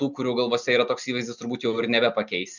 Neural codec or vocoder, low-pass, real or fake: none; 7.2 kHz; real